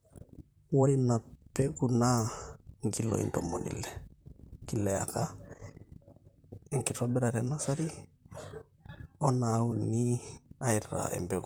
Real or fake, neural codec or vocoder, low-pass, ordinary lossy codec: fake; vocoder, 44.1 kHz, 128 mel bands, Pupu-Vocoder; none; none